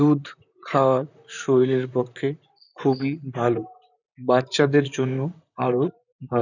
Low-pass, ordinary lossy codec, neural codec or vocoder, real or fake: 7.2 kHz; none; vocoder, 44.1 kHz, 128 mel bands, Pupu-Vocoder; fake